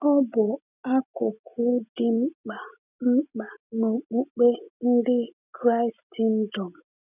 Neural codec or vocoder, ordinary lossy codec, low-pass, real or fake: none; none; 3.6 kHz; real